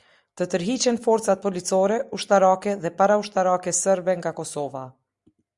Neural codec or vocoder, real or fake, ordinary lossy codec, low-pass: none; real; Opus, 64 kbps; 10.8 kHz